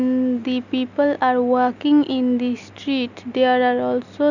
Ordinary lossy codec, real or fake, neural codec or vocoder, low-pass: none; real; none; 7.2 kHz